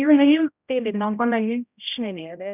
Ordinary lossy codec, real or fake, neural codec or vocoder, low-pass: none; fake; codec, 16 kHz, 0.5 kbps, X-Codec, HuBERT features, trained on general audio; 3.6 kHz